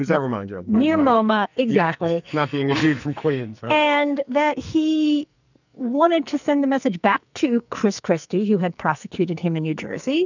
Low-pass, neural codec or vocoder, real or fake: 7.2 kHz; codec, 44.1 kHz, 2.6 kbps, SNAC; fake